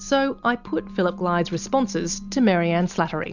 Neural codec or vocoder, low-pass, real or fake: none; 7.2 kHz; real